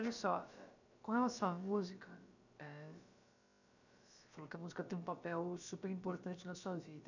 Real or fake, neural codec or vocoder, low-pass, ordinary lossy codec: fake; codec, 16 kHz, about 1 kbps, DyCAST, with the encoder's durations; 7.2 kHz; none